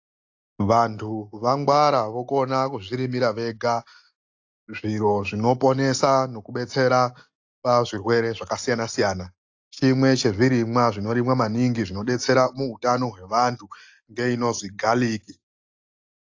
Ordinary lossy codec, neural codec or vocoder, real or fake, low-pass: AAC, 48 kbps; none; real; 7.2 kHz